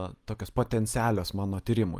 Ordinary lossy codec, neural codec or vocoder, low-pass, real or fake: Opus, 32 kbps; none; 14.4 kHz; real